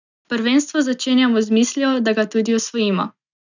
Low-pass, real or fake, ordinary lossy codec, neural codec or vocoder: 7.2 kHz; real; none; none